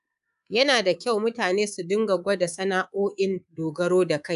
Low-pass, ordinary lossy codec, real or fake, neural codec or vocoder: 10.8 kHz; none; fake; codec, 24 kHz, 3.1 kbps, DualCodec